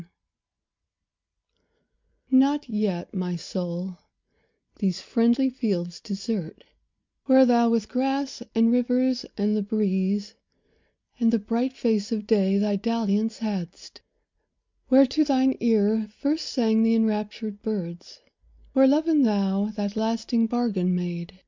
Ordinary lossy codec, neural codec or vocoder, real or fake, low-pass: MP3, 64 kbps; none; real; 7.2 kHz